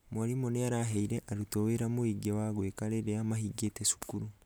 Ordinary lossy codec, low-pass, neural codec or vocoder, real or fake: none; none; none; real